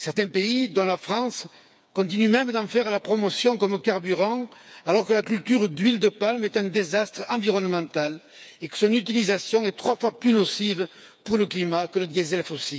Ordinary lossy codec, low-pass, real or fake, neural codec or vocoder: none; none; fake; codec, 16 kHz, 4 kbps, FreqCodec, smaller model